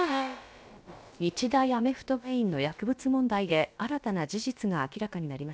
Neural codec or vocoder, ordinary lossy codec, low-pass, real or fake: codec, 16 kHz, about 1 kbps, DyCAST, with the encoder's durations; none; none; fake